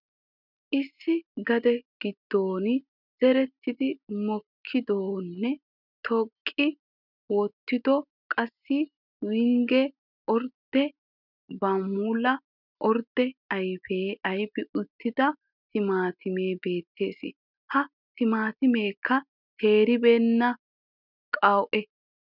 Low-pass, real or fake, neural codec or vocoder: 5.4 kHz; real; none